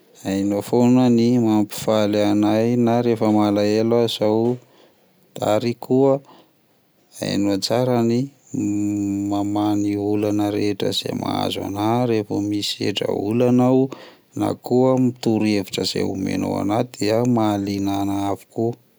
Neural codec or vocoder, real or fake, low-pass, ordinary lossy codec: none; real; none; none